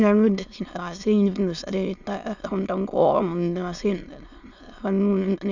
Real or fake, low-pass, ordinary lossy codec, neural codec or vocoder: fake; 7.2 kHz; none; autoencoder, 22.05 kHz, a latent of 192 numbers a frame, VITS, trained on many speakers